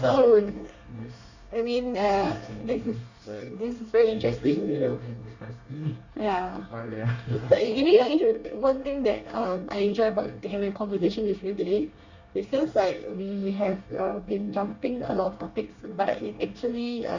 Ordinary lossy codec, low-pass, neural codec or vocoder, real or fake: Opus, 64 kbps; 7.2 kHz; codec, 24 kHz, 1 kbps, SNAC; fake